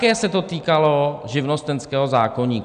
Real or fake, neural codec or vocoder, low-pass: real; none; 9.9 kHz